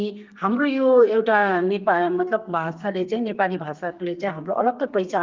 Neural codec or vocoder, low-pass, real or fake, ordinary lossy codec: codec, 32 kHz, 1.9 kbps, SNAC; 7.2 kHz; fake; Opus, 32 kbps